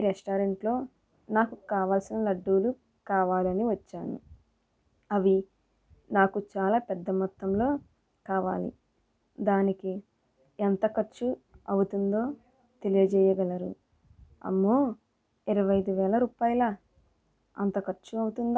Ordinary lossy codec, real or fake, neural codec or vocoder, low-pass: none; real; none; none